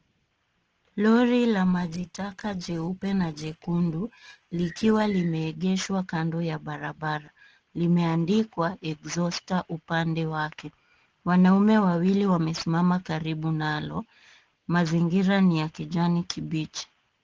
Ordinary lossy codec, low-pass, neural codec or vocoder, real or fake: Opus, 16 kbps; 7.2 kHz; none; real